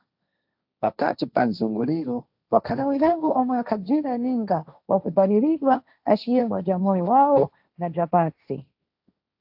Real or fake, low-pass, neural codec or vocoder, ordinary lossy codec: fake; 5.4 kHz; codec, 16 kHz, 1.1 kbps, Voila-Tokenizer; AAC, 48 kbps